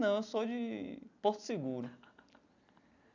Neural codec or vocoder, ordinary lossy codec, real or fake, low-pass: none; none; real; 7.2 kHz